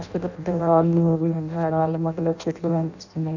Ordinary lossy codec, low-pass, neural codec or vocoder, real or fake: none; 7.2 kHz; codec, 16 kHz in and 24 kHz out, 0.6 kbps, FireRedTTS-2 codec; fake